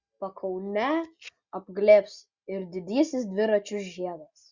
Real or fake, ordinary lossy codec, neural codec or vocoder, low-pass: real; Opus, 64 kbps; none; 7.2 kHz